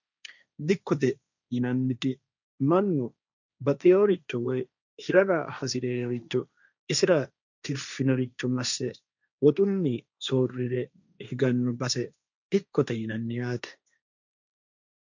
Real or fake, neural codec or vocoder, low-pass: fake; codec, 16 kHz, 1.1 kbps, Voila-Tokenizer; 7.2 kHz